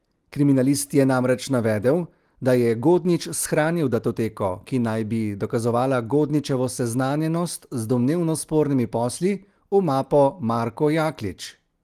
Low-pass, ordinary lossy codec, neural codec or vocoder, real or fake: 14.4 kHz; Opus, 24 kbps; none; real